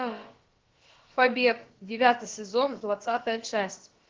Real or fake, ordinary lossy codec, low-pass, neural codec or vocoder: fake; Opus, 16 kbps; 7.2 kHz; codec, 16 kHz, about 1 kbps, DyCAST, with the encoder's durations